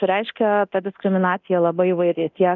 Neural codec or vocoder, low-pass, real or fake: codec, 24 kHz, 0.9 kbps, DualCodec; 7.2 kHz; fake